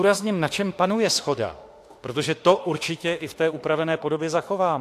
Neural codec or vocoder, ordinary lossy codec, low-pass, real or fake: autoencoder, 48 kHz, 32 numbers a frame, DAC-VAE, trained on Japanese speech; AAC, 64 kbps; 14.4 kHz; fake